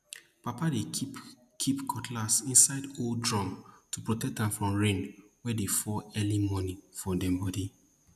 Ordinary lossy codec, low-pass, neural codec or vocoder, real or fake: none; 14.4 kHz; none; real